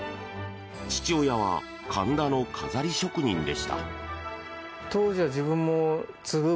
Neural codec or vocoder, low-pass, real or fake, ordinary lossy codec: none; none; real; none